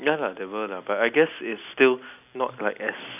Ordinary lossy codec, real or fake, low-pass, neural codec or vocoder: none; real; 3.6 kHz; none